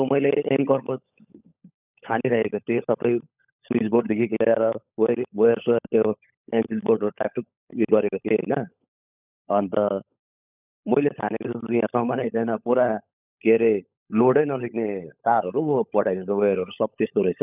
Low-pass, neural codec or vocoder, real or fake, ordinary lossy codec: 3.6 kHz; codec, 16 kHz, 16 kbps, FunCodec, trained on LibriTTS, 50 frames a second; fake; none